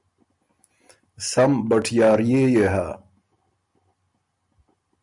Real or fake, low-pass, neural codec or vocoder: real; 10.8 kHz; none